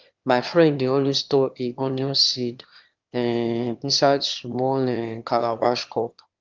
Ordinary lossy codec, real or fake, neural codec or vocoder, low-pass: Opus, 24 kbps; fake; autoencoder, 22.05 kHz, a latent of 192 numbers a frame, VITS, trained on one speaker; 7.2 kHz